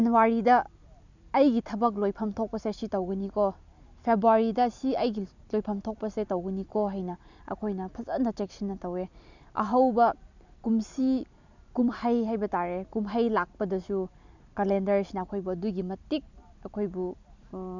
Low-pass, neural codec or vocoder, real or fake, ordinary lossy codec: 7.2 kHz; vocoder, 44.1 kHz, 128 mel bands every 256 samples, BigVGAN v2; fake; none